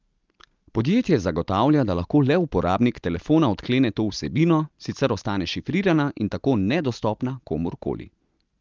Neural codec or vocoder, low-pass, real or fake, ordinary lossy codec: none; 7.2 kHz; real; Opus, 32 kbps